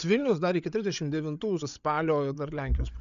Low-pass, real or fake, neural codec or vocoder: 7.2 kHz; fake; codec, 16 kHz, 8 kbps, FreqCodec, larger model